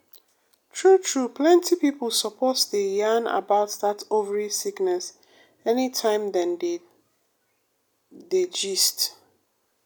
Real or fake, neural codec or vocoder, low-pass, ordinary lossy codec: real; none; none; none